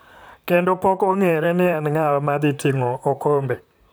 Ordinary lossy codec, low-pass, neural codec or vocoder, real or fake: none; none; vocoder, 44.1 kHz, 128 mel bands, Pupu-Vocoder; fake